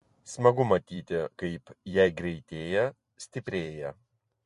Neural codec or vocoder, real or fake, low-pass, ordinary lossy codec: vocoder, 44.1 kHz, 128 mel bands every 256 samples, BigVGAN v2; fake; 14.4 kHz; MP3, 48 kbps